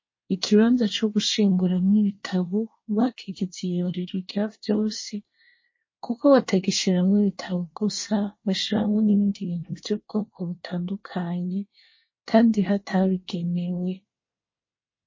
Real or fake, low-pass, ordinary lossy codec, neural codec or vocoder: fake; 7.2 kHz; MP3, 32 kbps; codec, 24 kHz, 1 kbps, SNAC